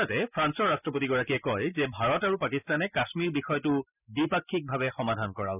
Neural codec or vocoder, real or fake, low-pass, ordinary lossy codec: none; real; 3.6 kHz; none